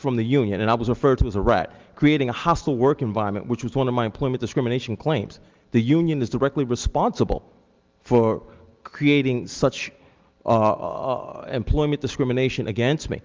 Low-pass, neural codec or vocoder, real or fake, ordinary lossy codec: 7.2 kHz; none; real; Opus, 32 kbps